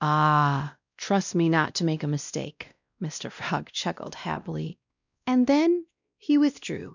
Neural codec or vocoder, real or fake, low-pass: codec, 16 kHz, 1 kbps, X-Codec, WavLM features, trained on Multilingual LibriSpeech; fake; 7.2 kHz